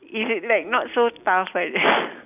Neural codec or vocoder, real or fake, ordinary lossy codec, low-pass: none; real; none; 3.6 kHz